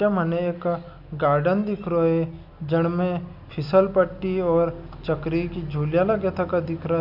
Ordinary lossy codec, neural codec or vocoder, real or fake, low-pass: none; none; real; 5.4 kHz